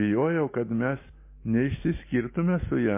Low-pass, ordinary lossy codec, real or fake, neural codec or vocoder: 3.6 kHz; MP3, 24 kbps; real; none